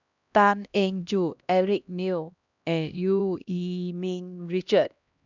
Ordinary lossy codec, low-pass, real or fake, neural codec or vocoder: none; 7.2 kHz; fake; codec, 16 kHz, 1 kbps, X-Codec, HuBERT features, trained on LibriSpeech